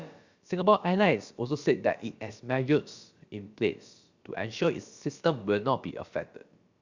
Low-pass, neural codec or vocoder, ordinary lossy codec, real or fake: 7.2 kHz; codec, 16 kHz, about 1 kbps, DyCAST, with the encoder's durations; Opus, 64 kbps; fake